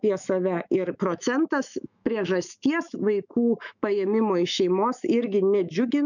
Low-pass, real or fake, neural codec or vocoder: 7.2 kHz; fake; autoencoder, 48 kHz, 128 numbers a frame, DAC-VAE, trained on Japanese speech